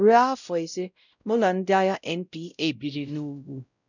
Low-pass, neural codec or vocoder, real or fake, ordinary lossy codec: 7.2 kHz; codec, 16 kHz, 0.5 kbps, X-Codec, WavLM features, trained on Multilingual LibriSpeech; fake; none